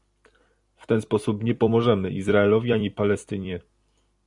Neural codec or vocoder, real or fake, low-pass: vocoder, 44.1 kHz, 128 mel bands every 256 samples, BigVGAN v2; fake; 10.8 kHz